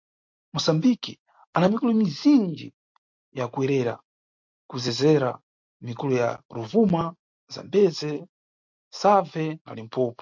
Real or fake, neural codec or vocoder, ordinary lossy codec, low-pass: real; none; MP3, 48 kbps; 7.2 kHz